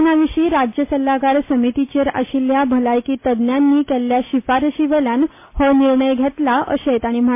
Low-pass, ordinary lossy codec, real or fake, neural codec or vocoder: 3.6 kHz; MP3, 24 kbps; real; none